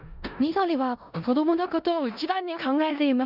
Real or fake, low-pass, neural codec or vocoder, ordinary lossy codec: fake; 5.4 kHz; codec, 16 kHz in and 24 kHz out, 0.4 kbps, LongCat-Audio-Codec, four codebook decoder; Opus, 64 kbps